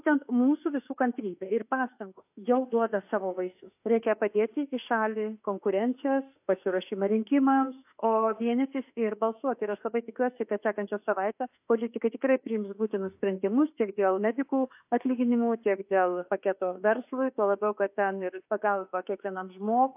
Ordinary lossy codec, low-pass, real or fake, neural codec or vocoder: AAC, 32 kbps; 3.6 kHz; fake; autoencoder, 48 kHz, 32 numbers a frame, DAC-VAE, trained on Japanese speech